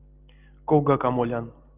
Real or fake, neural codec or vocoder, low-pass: real; none; 3.6 kHz